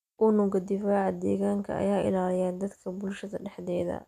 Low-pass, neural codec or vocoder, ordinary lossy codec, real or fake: 14.4 kHz; none; none; real